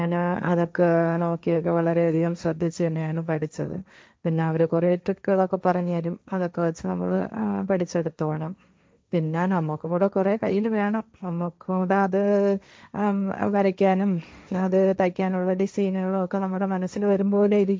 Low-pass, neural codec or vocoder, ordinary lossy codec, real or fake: none; codec, 16 kHz, 1.1 kbps, Voila-Tokenizer; none; fake